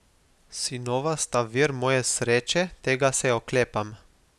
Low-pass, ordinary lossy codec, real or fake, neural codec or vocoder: none; none; real; none